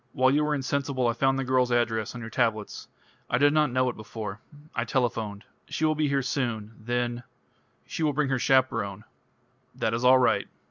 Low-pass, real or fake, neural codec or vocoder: 7.2 kHz; real; none